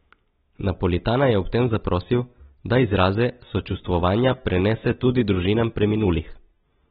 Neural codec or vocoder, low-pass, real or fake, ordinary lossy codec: none; 19.8 kHz; real; AAC, 16 kbps